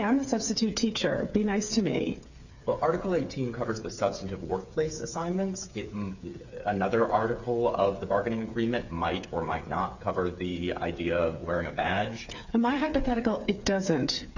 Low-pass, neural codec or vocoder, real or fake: 7.2 kHz; codec, 16 kHz, 8 kbps, FreqCodec, smaller model; fake